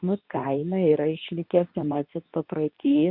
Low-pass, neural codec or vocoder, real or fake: 5.4 kHz; codec, 24 kHz, 0.9 kbps, WavTokenizer, medium speech release version 2; fake